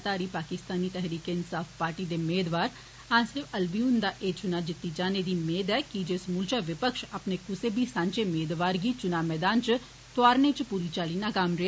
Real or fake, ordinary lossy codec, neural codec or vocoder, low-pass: real; none; none; none